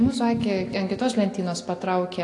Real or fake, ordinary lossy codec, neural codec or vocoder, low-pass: real; AAC, 48 kbps; none; 10.8 kHz